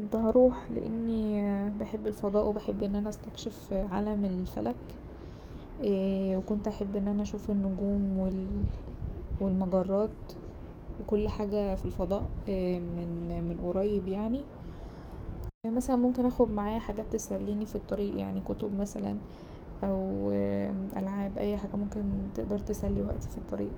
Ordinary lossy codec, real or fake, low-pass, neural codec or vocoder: none; fake; none; codec, 44.1 kHz, 7.8 kbps, DAC